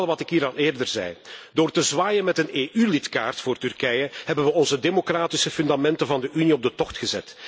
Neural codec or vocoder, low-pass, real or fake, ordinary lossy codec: none; none; real; none